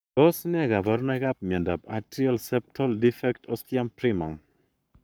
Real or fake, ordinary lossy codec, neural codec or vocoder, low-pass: fake; none; codec, 44.1 kHz, 7.8 kbps, Pupu-Codec; none